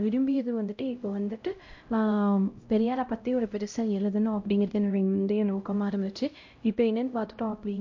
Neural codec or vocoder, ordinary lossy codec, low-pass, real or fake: codec, 16 kHz, 0.5 kbps, X-Codec, HuBERT features, trained on LibriSpeech; MP3, 48 kbps; 7.2 kHz; fake